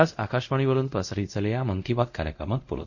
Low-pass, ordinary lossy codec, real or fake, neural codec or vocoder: 7.2 kHz; none; fake; codec, 24 kHz, 0.5 kbps, DualCodec